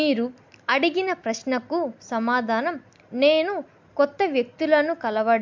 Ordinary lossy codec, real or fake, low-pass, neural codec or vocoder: MP3, 64 kbps; real; 7.2 kHz; none